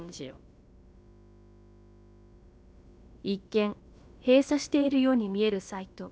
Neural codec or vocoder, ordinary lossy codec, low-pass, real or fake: codec, 16 kHz, about 1 kbps, DyCAST, with the encoder's durations; none; none; fake